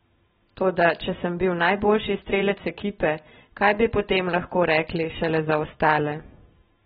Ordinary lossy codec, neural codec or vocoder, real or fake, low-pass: AAC, 16 kbps; none; real; 19.8 kHz